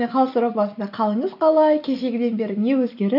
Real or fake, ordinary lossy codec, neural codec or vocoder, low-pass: real; none; none; 5.4 kHz